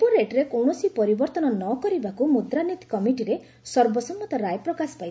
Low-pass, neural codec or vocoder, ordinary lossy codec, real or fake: none; none; none; real